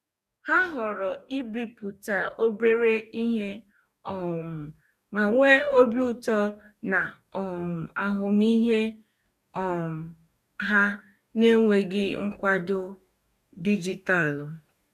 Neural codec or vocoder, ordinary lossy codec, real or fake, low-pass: codec, 44.1 kHz, 2.6 kbps, DAC; none; fake; 14.4 kHz